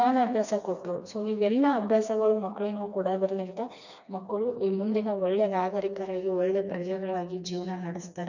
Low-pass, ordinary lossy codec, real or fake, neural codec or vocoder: 7.2 kHz; none; fake; codec, 16 kHz, 2 kbps, FreqCodec, smaller model